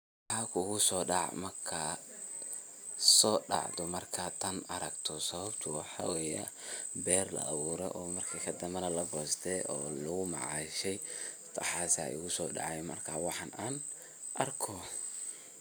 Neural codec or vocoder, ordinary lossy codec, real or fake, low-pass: none; none; real; none